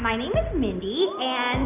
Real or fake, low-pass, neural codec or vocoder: real; 3.6 kHz; none